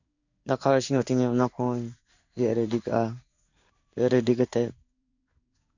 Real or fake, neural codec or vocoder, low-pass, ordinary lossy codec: fake; codec, 16 kHz in and 24 kHz out, 1 kbps, XY-Tokenizer; 7.2 kHz; none